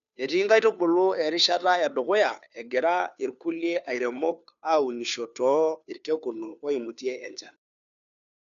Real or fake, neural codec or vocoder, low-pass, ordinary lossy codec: fake; codec, 16 kHz, 2 kbps, FunCodec, trained on Chinese and English, 25 frames a second; 7.2 kHz; none